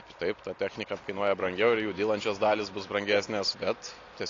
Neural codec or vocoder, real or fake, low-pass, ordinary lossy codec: none; real; 7.2 kHz; AAC, 32 kbps